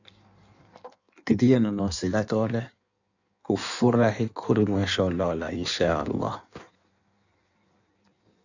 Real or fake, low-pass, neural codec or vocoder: fake; 7.2 kHz; codec, 16 kHz in and 24 kHz out, 1.1 kbps, FireRedTTS-2 codec